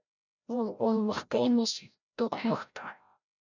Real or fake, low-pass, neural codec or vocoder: fake; 7.2 kHz; codec, 16 kHz, 0.5 kbps, FreqCodec, larger model